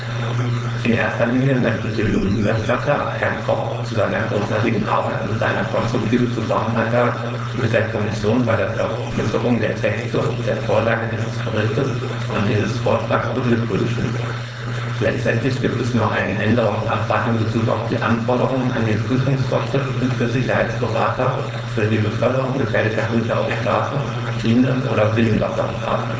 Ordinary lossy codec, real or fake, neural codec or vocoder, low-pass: none; fake; codec, 16 kHz, 4.8 kbps, FACodec; none